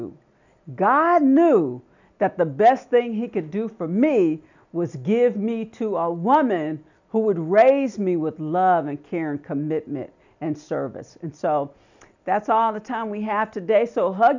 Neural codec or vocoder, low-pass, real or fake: none; 7.2 kHz; real